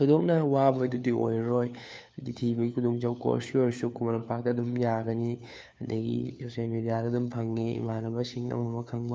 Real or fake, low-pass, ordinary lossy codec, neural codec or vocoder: fake; none; none; codec, 16 kHz, 4 kbps, FunCodec, trained on LibriTTS, 50 frames a second